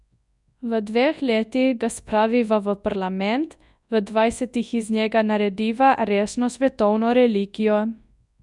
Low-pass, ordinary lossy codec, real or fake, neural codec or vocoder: 10.8 kHz; none; fake; codec, 24 kHz, 0.9 kbps, WavTokenizer, large speech release